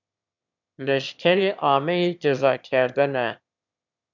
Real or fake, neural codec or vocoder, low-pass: fake; autoencoder, 22.05 kHz, a latent of 192 numbers a frame, VITS, trained on one speaker; 7.2 kHz